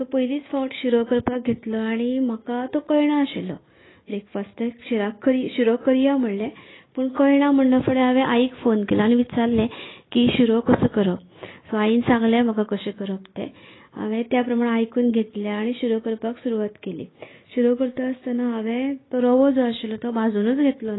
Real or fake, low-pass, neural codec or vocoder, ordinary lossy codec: real; 7.2 kHz; none; AAC, 16 kbps